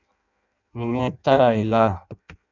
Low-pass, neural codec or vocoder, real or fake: 7.2 kHz; codec, 16 kHz in and 24 kHz out, 0.6 kbps, FireRedTTS-2 codec; fake